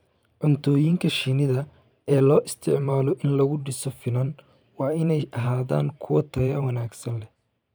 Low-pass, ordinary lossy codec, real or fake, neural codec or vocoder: none; none; fake; vocoder, 44.1 kHz, 128 mel bands every 512 samples, BigVGAN v2